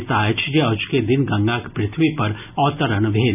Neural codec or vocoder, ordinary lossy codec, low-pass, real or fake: none; none; 3.6 kHz; real